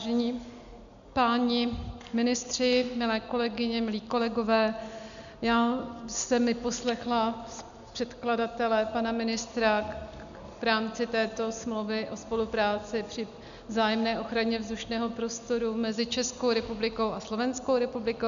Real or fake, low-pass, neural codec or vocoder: real; 7.2 kHz; none